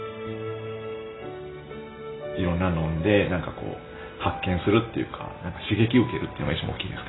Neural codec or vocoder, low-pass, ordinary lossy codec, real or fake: none; 7.2 kHz; AAC, 16 kbps; real